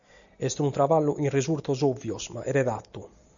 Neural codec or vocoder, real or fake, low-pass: none; real; 7.2 kHz